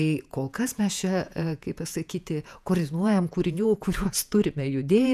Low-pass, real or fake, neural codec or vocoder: 14.4 kHz; fake; vocoder, 48 kHz, 128 mel bands, Vocos